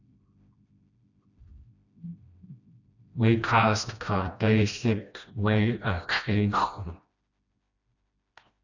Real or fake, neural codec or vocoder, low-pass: fake; codec, 16 kHz, 1 kbps, FreqCodec, smaller model; 7.2 kHz